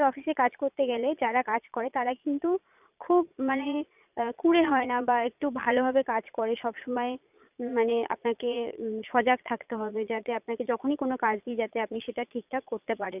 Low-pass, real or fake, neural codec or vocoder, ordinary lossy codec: 3.6 kHz; fake; vocoder, 22.05 kHz, 80 mel bands, Vocos; none